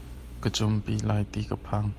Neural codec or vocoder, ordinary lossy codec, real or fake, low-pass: vocoder, 44.1 kHz, 128 mel bands every 256 samples, BigVGAN v2; Opus, 32 kbps; fake; 14.4 kHz